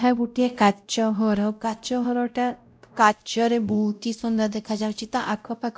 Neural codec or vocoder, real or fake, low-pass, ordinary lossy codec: codec, 16 kHz, 0.5 kbps, X-Codec, WavLM features, trained on Multilingual LibriSpeech; fake; none; none